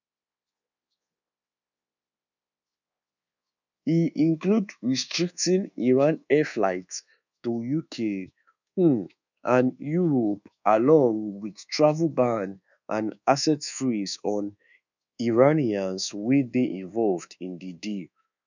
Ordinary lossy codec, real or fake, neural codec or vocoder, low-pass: none; fake; codec, 24 kHz, 1.2 kbps, DualCodec; 7.2 kHz